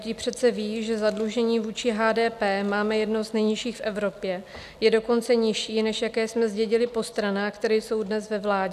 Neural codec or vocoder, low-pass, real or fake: none; 14.4 kHz; real